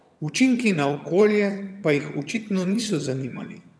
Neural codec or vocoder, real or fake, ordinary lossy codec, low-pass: vocoder, 22.05 kHz, 80 mel bands, HiFi-GAN; fake; none; none